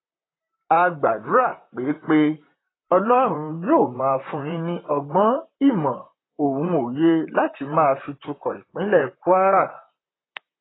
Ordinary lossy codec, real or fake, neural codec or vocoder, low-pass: AAC, 16 kbps; fake; vocoder, 44.1 kHz, 128 mel bands, Pupu-Vocoder; 7.2 kHz